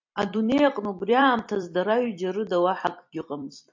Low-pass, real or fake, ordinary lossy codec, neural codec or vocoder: 7.2 kHz; fake; MP3, 64 kbps; vocoder, 44.1 kHz, 128 mel bands every 256 samples, BigVGAN v2